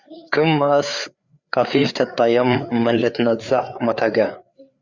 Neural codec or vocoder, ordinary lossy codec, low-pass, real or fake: codec, 16 kHz, 4 kbps, FreqCodec, larger model; Opus, 64 kbps; 7.2 kHz; fake